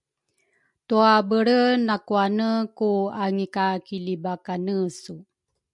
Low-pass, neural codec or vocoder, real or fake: 10.8 kHz; none; real